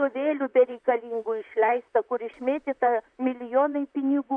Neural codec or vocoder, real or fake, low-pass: vocoder, 44.1 kHz, 128 mel bands, Pupu-Vocoder; fake; 9.9 kHz